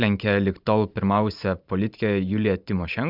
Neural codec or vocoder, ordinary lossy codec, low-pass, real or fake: vocoder, 44.1 kHz, 128 mel bands every 512 samples, BigVGAN v2; Opus, 64 kbps; 5.4 kHz; fake